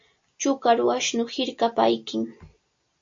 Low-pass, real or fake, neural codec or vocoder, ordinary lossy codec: 7.2 kHz; real; none; MP3, 48 kbps